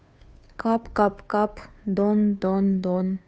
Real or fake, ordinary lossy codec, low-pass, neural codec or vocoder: fake; none; none; codec, 16 kHz, 2 kbps, FunCodec, trained on Chinese and English, 25 frames a second